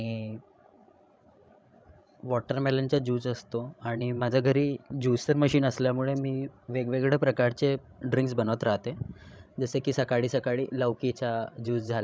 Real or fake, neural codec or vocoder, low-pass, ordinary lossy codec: fake; codec, 16 kHz, 16 kbps, FreqCodec, larger model; none; none